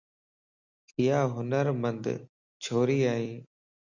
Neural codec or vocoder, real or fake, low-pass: none; real; 7.2 kHz